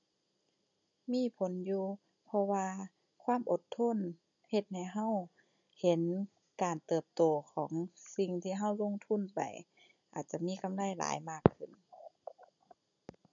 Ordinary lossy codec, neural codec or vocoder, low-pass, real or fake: none; none; 7.2 kHz; real